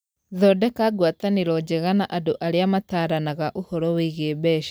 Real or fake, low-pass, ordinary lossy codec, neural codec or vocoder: real; none; none; none